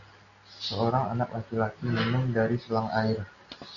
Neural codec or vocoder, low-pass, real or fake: none; 7.2 kHz; real